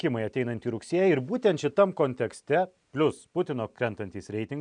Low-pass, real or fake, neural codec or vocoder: 9.9 kHz; real; none